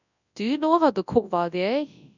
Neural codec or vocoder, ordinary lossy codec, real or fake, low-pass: codec, 24 kHz, 0.9 kbps, WavTokenizer, large speech release; none; fake; 7.2 kHz